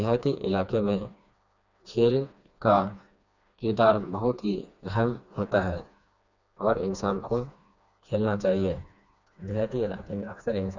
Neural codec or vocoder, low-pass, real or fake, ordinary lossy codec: codec, 16 kHz, 2 kbps, FreqCodec, smaller model; 7.2 kHz; fake; none